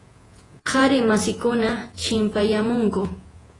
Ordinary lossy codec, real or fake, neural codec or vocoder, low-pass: AAC, 32 kbps; fake; vocoder, 48 kHz, 128 mel bands, Vocos; 10.8 kHz